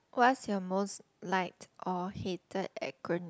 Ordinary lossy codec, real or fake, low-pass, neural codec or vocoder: none; real; none; none